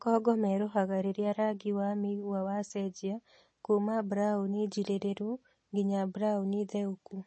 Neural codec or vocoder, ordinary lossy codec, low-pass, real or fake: none; MP3, 32 kbps; 9.9 kHz; real